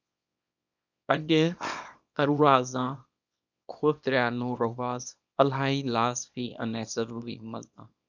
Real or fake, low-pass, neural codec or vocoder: fake; 7.2 kHz; codec, 24 kHz, 0.9 kbps, WavTokenizer, small release